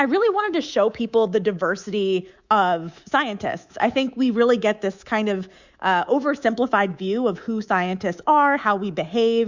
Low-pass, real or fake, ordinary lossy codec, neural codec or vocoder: 7.2 kHz; fake; Opus, 64 kbps; codec, 24 kHz, 3.1 kbps, DualCodec